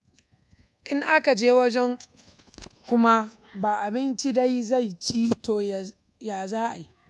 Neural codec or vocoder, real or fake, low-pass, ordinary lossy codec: codec, 24 kHz, 1.2 kbps, DualCodec; fake; none; none